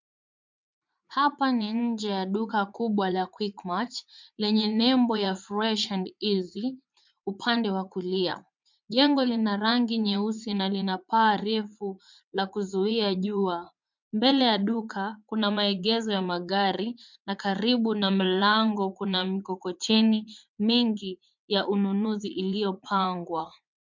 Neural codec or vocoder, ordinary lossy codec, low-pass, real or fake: vocoder, 44.1 kHz, 80 mel bands, Vocos; MP3, 64 kbps; 7.2 kHz; fake